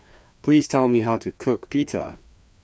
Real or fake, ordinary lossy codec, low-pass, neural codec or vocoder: fake; none; none; codec, 16 kHz, 2 kbps, FreqCodec, larger model